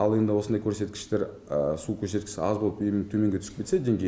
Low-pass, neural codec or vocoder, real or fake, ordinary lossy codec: none; none; real; none